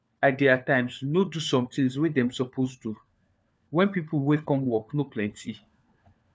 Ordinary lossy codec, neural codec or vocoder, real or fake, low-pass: none; codec, 16 kHz, 4 kbps, FunCodec, trained on LibriTTS, 50 frames a second; fake; none